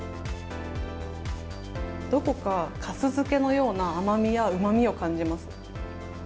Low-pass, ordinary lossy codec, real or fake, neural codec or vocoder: none; none; real; none